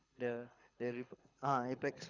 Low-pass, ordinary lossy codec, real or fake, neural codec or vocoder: 7.2 kHz; Opus, 64 kbps; fake; codec, 24 kHz, 6 kbps, HILCodec